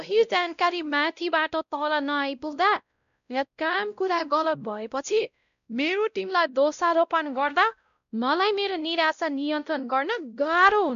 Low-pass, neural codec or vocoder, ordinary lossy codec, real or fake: 7.2 kHz; codec, 16 kHz, 0.5 kbps, X-Codec, WavLM features, trained on Multilingual LibriSpeech; none; fake